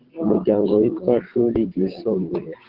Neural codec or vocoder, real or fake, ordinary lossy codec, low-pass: vocoder, 22.05 kHz, 80 mel bands, WaveNeXt; fake; Opus, 16 kbps; 5.4 kHz